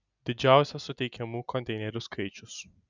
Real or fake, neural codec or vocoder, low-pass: real; none; 7.2 kHz